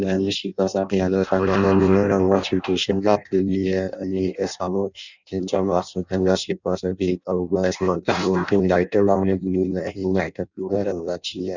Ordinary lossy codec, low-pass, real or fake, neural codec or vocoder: none; 7.2 kHz; fake; codec, 16 kHz in and 24 kHz out, 0.6 kbps, FireRedTTS-2 codec